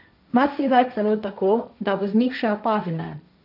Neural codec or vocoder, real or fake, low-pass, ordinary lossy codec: codec, 16 kHz, 1.1 kbps, Voila-Tokenizer; fake; 5.4 kHz; none